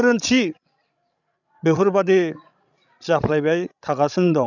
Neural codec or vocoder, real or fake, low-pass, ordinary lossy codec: codec, 16 kHz, 16 kbps, FreqCodec, larger model; fake; 7.2 kHz; none